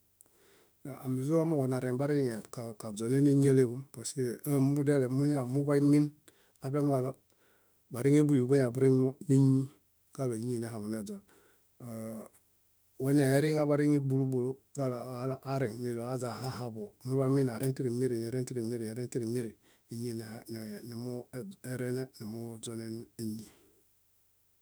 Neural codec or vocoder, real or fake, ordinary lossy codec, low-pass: autoencoder, 48 kHz, 32 numbers a frame, DAC-VAE, trained on Japanese speech; fake; none; none